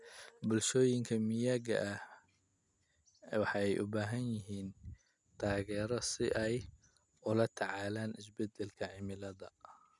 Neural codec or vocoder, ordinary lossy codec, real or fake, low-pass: none; none; real; 10.8 kHz